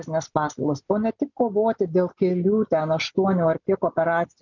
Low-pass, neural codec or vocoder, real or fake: 7.2 kHz; none; real